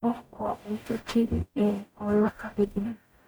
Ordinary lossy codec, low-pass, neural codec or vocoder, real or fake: none; none; codec, 44.1 kHz, 0.9 kbps, DAC; fake